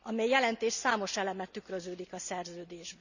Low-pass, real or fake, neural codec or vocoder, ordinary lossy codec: 7.2 kHz; real; none; none